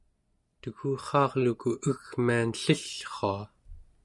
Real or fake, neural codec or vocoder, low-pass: real; none; 10.8 kHz